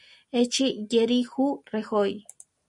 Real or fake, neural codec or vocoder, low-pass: real; none; 10.8 kHz